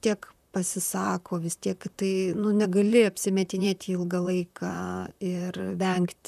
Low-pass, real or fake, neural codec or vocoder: 14.4 kHz; fake; vocoder, 44.1 kHz, 128 mel bands, Pupu-Vocoder